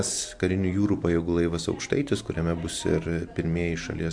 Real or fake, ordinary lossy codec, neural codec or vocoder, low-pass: real; MP3, 64 kbps; none; 9.9 kHz